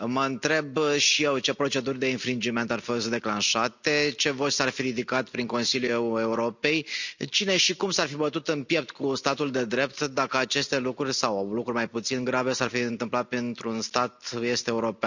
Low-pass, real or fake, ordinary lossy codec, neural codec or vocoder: 7.2 kHz; real; none; none